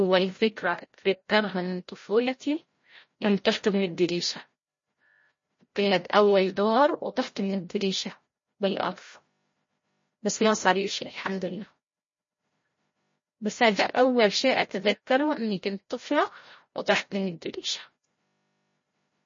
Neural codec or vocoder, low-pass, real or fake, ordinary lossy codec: codec, 16 kHz, 0.5 kbps, FreqCodec, larger model; 7.2 kHz; fake; MP3, 32 kbps